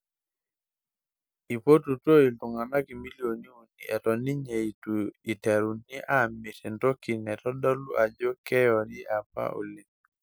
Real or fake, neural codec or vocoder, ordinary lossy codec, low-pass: real; none; none; none